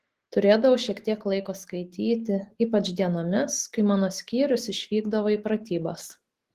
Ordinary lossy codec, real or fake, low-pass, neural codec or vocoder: Opus, 16 kbps; fake; 14.4 kHz; autoencoder, 48 kHz, 128 numbers a frame, DAC-VAE, trained on Japanese speech